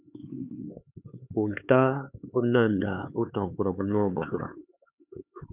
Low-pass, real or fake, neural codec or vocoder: 3.6 kHz; fake; codec, 16 kHz, 4 kbps, X-Codec, HuBERT features, trained on LibriSpeech